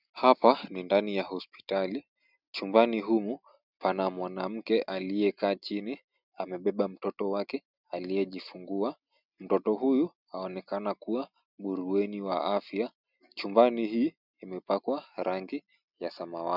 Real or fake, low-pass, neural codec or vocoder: real; 5.4 kHz; none